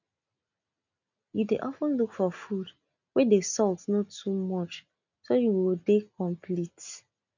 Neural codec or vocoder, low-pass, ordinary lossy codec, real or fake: none; 7.2 kHz; none; real